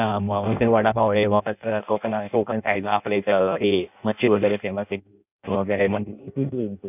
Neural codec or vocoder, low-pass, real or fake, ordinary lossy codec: codec, 16 kHz in and 24 kHz out, 0.6 kbps, FireRedTTS-2 codec; 3.6 kHz; fake; none